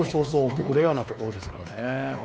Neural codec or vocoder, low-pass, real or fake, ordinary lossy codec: codec, 16 kHz, 2 kbps, X-Codec, WavLM features, trained on Multilingual LibriSpeech; none; fake; none